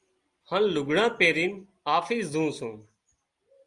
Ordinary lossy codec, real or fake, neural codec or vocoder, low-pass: Opus, 32 kbps; real; none; 10.8 kHz